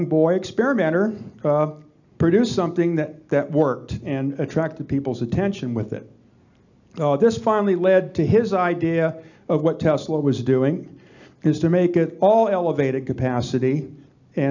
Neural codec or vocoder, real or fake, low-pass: none; real; 7.2 kHz